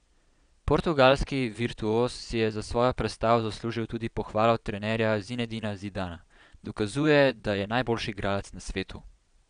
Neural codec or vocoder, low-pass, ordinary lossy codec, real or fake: vocoder, 22.05 kHz, 80 mel bands, Vocos; 9.9 kHz; none; fake